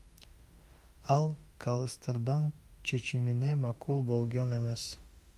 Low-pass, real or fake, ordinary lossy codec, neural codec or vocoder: 14.4 kHz; fake; Opus, 32 kbps; autoencoder, 48 kHz, 32 numbers a frame, DAC-VAE, trained on Japanese speech